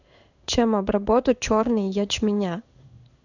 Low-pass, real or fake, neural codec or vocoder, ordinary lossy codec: 7.2 kHz; fake; codec, 16 kHz in and 24 kHz out, 1 kbps, XY-Tokenizer; none